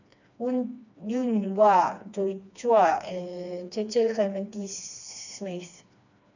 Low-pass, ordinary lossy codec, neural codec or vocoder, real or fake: 7.2 kHz; none; codec, 16 kHz, 2 kbps, FreqCodec, smaller model; fake